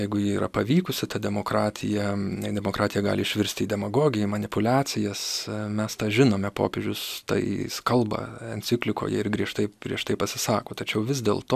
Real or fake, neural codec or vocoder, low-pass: real; none; 14.4 kHz